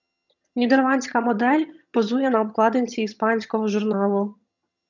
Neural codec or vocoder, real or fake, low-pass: vocoder, 22.05 kHz, 80 mel bands, HiFi-GAN; fake; 7.2 kHz